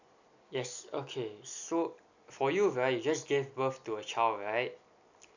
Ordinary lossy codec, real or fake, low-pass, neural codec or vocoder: none; real; 7.2 kHz; none